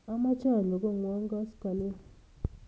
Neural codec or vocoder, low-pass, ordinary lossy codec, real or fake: none; none; none; real